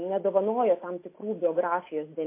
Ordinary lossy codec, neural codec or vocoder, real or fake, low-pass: MP3, 24 kbps; none; real; 3.6 kHz